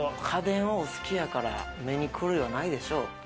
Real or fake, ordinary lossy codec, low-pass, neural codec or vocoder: real; none; none; none